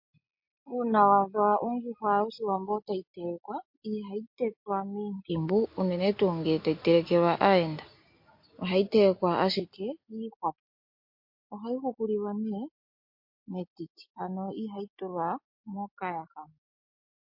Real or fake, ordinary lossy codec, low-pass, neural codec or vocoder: real; MP3, 32 kbps; 5.4 kHz; none